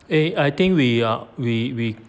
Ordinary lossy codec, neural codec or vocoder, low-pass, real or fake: none; none; none; real